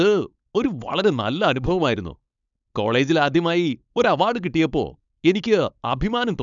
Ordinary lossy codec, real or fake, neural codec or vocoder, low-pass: none; fake; codec, 16 kHz, 4.8 kbps, FACodec; 7.2 kHz